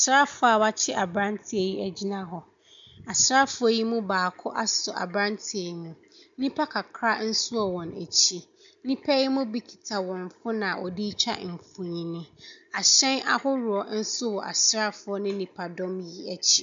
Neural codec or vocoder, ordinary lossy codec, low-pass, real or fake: none; MP3, 64 kbps; 7.2 kHz; real